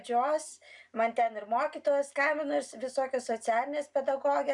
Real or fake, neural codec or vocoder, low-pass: real; none; 10.8 kHz